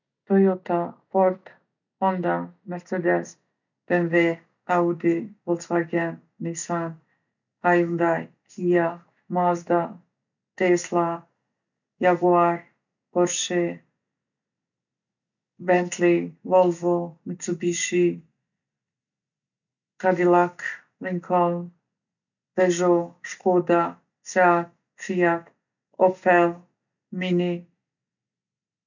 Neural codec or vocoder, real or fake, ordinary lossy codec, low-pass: none; real; none; 7.2 kHz